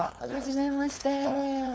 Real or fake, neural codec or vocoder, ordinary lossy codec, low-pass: fake; codec, 16 kHz, 4.8 kbps, FACodec; none; none